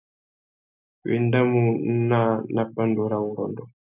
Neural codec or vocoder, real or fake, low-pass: none; real; 3.6 kHz